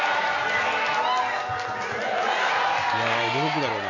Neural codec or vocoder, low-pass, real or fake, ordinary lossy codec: none; 7.2 kHz; real; none